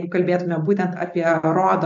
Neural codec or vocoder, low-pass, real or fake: none; 7.2 kHz; real